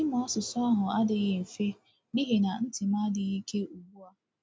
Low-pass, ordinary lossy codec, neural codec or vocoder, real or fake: none; none; none; real